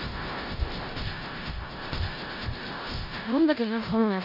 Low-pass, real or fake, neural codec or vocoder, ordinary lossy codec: 5.4 kHz; fake; codec, 16 kHz in and 24 kHz out, 0.4 kbps, LongCat-Audio-Codec, four codebook decoder; AAC, 48 kbps